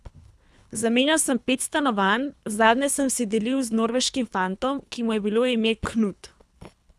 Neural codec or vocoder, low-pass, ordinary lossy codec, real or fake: codec, 24 kHz, 3 kbps, HILCodec; none; none; fake